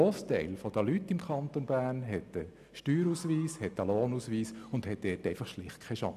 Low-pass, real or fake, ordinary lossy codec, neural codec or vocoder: 14.4 kHz; real; none; none